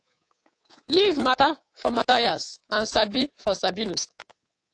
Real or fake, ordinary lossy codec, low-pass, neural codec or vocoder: fake; AAC, 48 kbps; 9.9 kHz; codec, 24 kHz, 3.1 kbps, DualCodec